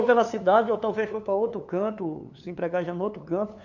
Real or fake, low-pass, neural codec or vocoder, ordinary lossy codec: fake; 7.2 kHz; codec, 16 kHz, 2 kbps, FunCodec, trained on LibriTTS, 25 frames a second; none